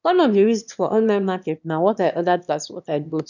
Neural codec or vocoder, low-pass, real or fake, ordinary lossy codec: autoencoder, 22.05 kHz, a latent of 192 numbers a frame, VITS, trained on one speaker; 7.2 kHz; fake; none